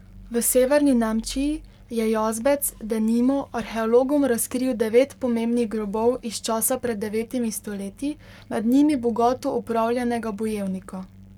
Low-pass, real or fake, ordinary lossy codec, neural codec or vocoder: 19.8 kHz; fake; none; codec, 44.1 kHz, 7.8 kbps, Pupu-Codec